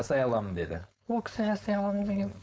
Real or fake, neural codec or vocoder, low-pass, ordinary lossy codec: fake; codec, 16 kHz, 4.8 kbps, FACodec; none; none